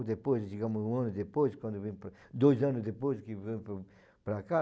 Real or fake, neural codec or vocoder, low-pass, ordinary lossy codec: real; none; none; none